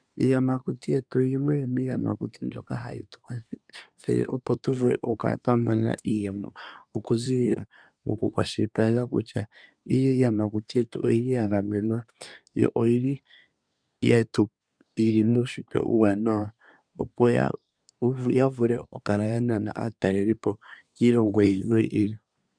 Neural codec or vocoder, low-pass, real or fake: codec, 24 kHz, 1 kbps, SNAC; 9.9 kHz; fake